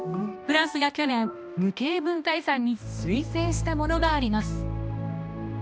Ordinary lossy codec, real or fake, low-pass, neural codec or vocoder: none; fake; none; codec, 16 kHz, 1 kbps, X-Codec, HuBERT features, trained on balanced general audio